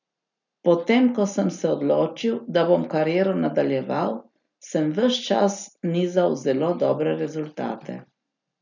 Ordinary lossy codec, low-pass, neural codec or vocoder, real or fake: none; 7.2 kHz; none; real